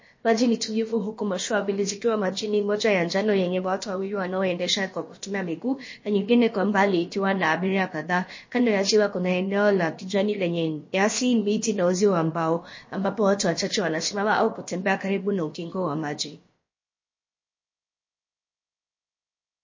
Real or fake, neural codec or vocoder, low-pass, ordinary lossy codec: fake; codec, 16 kHz, about 1 kbps, DyCAST, with the encoder's durations; 7.2 kHz; MP3, 32 kbps